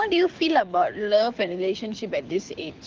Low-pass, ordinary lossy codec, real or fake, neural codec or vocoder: 7.2 kHz; Opus, 24 kbps; fake; codec, 24 kHz, 6 kbps, HILCodec